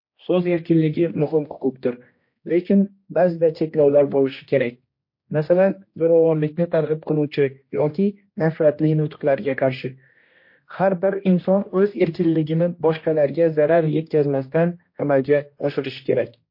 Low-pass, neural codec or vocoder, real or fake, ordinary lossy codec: 5.4 kHz; codec, 16 kHz, 1 kbps, X-Codec, HuBERT features, trained on general audio; fake; MP3, 32 kbps